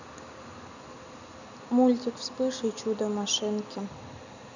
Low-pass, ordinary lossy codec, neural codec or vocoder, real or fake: 7.2 kHz; none; none; real